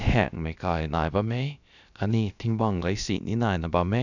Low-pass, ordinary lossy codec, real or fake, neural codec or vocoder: 7.2 kHz; none; fake; codec, 16 kHz, about 1 kbps, DyCAST, with the encoder's durations